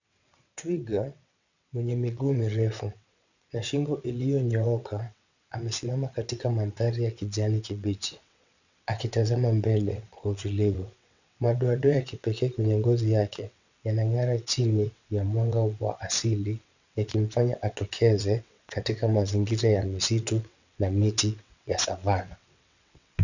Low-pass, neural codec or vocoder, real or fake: 7.2 kHz; vocoder, 22.05 kHz, 80 mel bands, WaveNeXt; fake